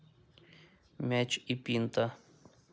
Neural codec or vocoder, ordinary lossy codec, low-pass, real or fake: none; none; none; real